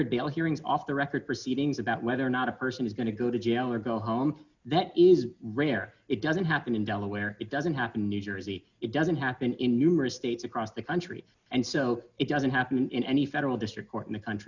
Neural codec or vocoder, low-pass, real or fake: none; 7.2 kHz; real